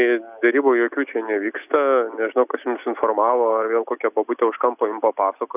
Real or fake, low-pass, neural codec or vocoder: real; 3.6 kHz; none